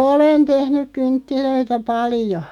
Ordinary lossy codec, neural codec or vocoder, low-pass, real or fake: none; autoencoder, 48 kHz, 128 numbers a frame, DAC-VAE, trained on Japanese speech; 19.8 kHz; fake